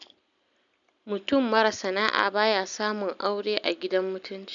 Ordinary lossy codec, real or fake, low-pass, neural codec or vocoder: none; real; 7.2 kHz; none